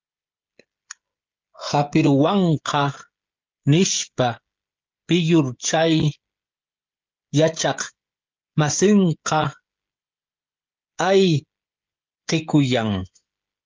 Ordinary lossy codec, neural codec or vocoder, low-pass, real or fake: Opus, 24 kbps; codec, 16 kHz, 16 kbps, FreqCodec, smaller model; 7.2 kHz; fake